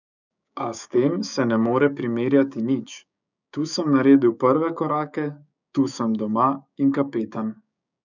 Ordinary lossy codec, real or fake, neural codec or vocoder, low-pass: none; fake; codec, 16 kHz, 6 kbps, DAC; 7.2 kHz